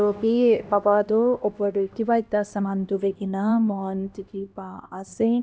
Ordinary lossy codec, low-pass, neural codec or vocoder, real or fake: none; none; codec, 16 kHz, 1 kbps, X-Codec, HuBERT features, trained on LibriSpeech; fake